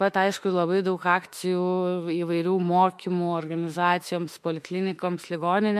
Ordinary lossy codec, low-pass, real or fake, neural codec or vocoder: MP3, 64 kbps; 14.4 kHz; fake; autoencoder, 48 kHz, 32 numbers a frame, DAC-VAE, trained on Japanese speech